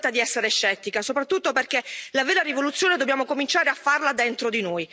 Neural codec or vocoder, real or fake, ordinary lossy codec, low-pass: none; real; none; none